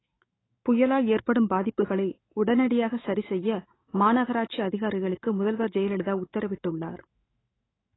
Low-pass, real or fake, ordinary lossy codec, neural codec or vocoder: 7.2 kHz; fake; AAC, 16 kbps; autoencoder, 48 kHz, 128 numbers a frame, DAC-VAE, trained on Japanese speech